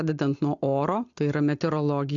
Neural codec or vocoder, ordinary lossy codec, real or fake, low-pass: none; AAC, 64 kbps; real; 7.2 kHz